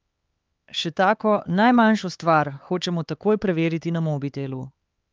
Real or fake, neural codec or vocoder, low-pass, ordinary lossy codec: fake; codec, 16 kHz, 4 kbps, X-Codec, HuBERT features, trained on LibriSpeech; 7.2 kHz; Opus, 24 kbps